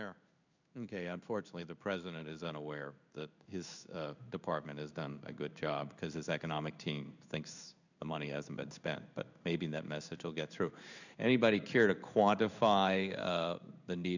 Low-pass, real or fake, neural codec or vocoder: 7.2 kHz; fake; codec, 16 kHz in and 24 kHz out, 1 kbps, XY-Tokenizer